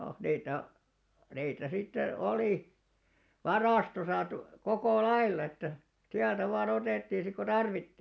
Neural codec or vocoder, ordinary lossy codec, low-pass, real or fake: none; none; none; real